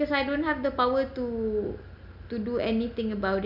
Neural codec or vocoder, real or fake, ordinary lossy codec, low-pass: none; real; none; 5.4 kHz